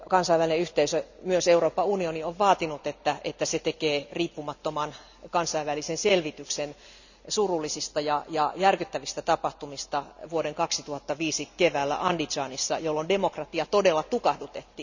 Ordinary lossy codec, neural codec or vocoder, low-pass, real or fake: none; none; 7.2 kHz; real